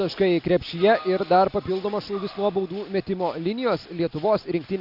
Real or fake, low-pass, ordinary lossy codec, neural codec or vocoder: real; 5.4 kHz; AAC, 48 kbps; none